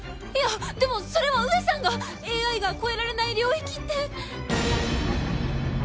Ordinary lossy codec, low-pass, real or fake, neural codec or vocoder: none; none; real; none